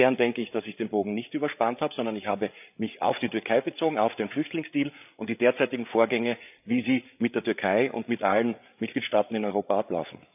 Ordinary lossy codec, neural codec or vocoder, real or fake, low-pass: none; codec, 16 kHz, 8 kbps, FreqCodec, larger model; fake; 3.6 kHz